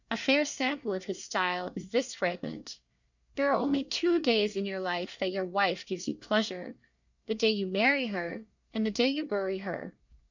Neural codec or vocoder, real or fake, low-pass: codec, 24 kHz, 1 kbps, SNAC; fake; 7.2 kHz